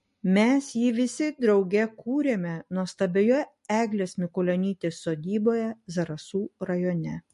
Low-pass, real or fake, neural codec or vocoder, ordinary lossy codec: 14.4 kHz; real; none; MP3, 48 kbps